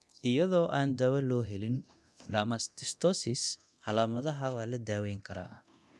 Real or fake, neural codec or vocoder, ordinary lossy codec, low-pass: fake; codec, 24 kHz, 0.9 kbps, DualCodec; none; none